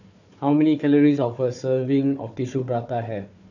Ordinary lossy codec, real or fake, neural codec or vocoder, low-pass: none; fake; codec, 16 kHz, 4 kbps, FunCodec, trained on Chinese and English, 50 frames a second; 7.2 kHz